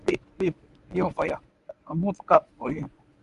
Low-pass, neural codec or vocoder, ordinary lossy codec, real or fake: 10.8 kHz; codec, 24 kHz, 0.9 kbps, WavTokenizer, medium speech release version 1; none; fake